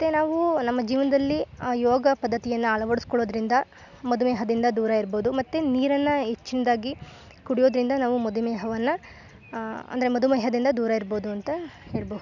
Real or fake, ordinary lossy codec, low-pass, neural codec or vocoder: real; none; 7.2 kHz; none